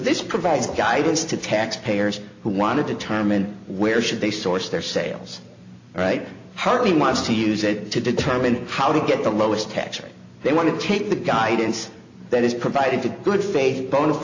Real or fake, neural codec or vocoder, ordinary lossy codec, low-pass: real; none; AAC, 48 kbps; 7.2 kHz